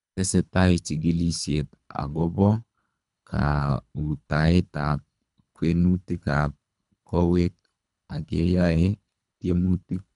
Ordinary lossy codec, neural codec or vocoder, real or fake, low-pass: none; codec, 24 kHz, 3 kbps, HILCodec; fake; 10.8 kHz